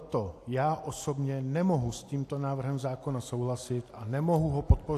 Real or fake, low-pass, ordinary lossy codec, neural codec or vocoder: real; 14.4 kHz; AAC, 64 kbps; none